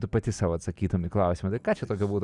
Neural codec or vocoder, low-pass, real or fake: none; 10.8 kHz; real